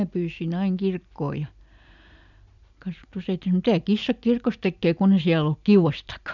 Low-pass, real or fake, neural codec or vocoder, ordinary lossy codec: 7.2 kHz; real; none; none